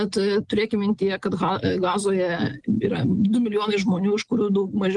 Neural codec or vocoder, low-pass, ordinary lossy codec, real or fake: none; 10.8 kHz; Opus, 24 kbps; real